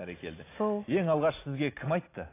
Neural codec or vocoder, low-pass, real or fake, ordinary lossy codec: none; 3.6 kHz; real; AAC, 24 kbps